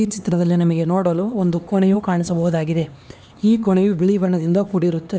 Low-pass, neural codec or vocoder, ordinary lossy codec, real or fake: none; codec, 16 kHz, 2 kbps, X-Codec, HuBERT features, trained on LibriSpeech; none; fake